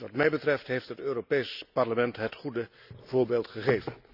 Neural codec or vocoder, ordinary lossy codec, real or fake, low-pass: none; none; real; 5.4 kHz